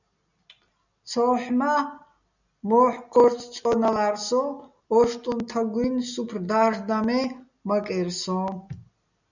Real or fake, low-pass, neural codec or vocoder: real; 7.2 kHz; none